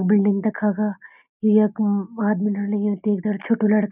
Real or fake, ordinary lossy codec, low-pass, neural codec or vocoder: real; none; 3.6 kHz; none